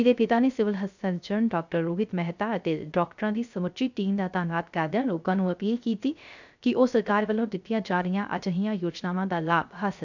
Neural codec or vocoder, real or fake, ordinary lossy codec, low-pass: codec, 16 kHz, 0.3 kbps, FocalCodec; fake; none; 7.2 kHz